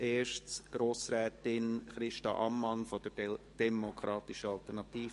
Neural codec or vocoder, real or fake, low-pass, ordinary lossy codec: codec, 44.1 kHz, 7.8 kbps, DAC; fake; 14.4 kHz; MP3, 48 kbps